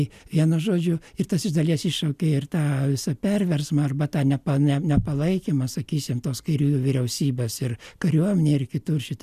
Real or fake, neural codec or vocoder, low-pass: real; none; 14.4 kHz